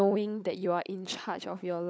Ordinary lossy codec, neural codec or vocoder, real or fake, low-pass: none; codec, 16 kHz, 16 kbps, FunCodec, trained on LibriTTS, 50 frames a second; fake; none